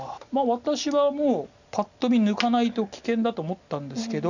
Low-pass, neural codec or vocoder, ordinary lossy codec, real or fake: 7.2 kHz; none; none; real